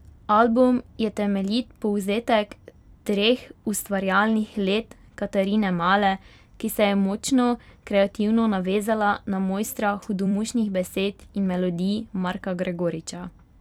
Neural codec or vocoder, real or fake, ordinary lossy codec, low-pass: vocoder, 44.1 kHz, 128 mel bands every 256 samples, BigVGAN v2; fake; none; 19.8 kHz